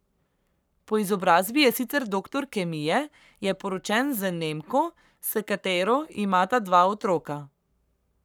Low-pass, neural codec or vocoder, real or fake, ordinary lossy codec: none; codec, 44.1 kHz, 7.8 kbps, Pupu-Codec; fake; none